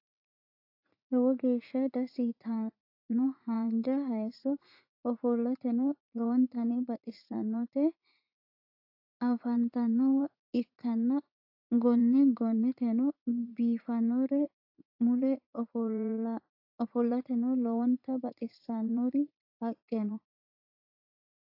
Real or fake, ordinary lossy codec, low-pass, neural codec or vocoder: fake; AAC, 32 kbps; 5.4 kHz; vocoder, 44.1 kHz, 80 mel bands, Vocos